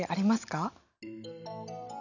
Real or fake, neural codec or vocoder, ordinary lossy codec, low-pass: real; none; none; 7.2 kHz